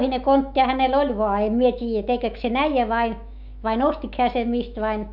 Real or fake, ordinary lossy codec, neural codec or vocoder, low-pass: fake; none; autoencoder, 48 kHz, 128 numbers a frame, DAC-VAE, trained on Japanese speech; 5.4 kHz